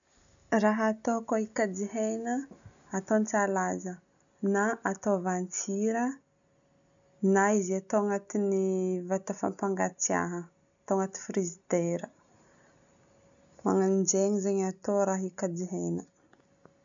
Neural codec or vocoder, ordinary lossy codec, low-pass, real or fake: none; none; 7.2 kHz; real